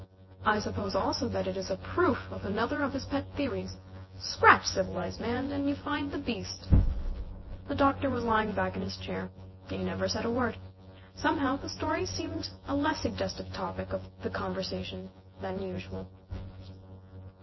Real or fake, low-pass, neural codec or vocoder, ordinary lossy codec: fake; 7.2 kHz; vocoder, 24 kHz, 100 mel bands, Vocos; MP3, 24 kbps